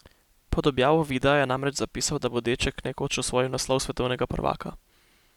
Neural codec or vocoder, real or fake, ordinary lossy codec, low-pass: none; real; none; 19.8 kHz